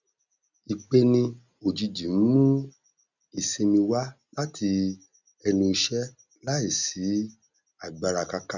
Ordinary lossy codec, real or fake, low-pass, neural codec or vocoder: none; real; 7.2 kHz; none